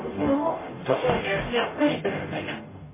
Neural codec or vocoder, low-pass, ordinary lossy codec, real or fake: codec, 44.1 kHz, 0.9 kbps, DAC; 3.6 kHz; MP3, 16 kbps; fake